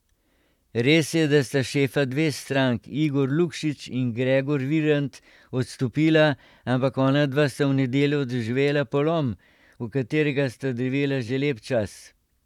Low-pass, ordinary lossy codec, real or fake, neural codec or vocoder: 19.8 kHz; none; fake; vocoder, 44.1 kHz, 128 mel bands, Pupu-Vocoder